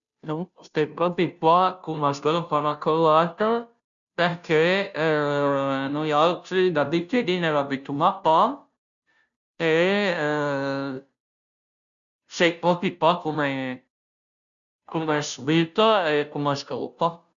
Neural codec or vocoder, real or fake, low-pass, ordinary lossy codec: codec, 16 kHz, 0.5 kbps, FunCodec, trained on Chinese and English, 25 frames a second; fake; 7.2 kHz; none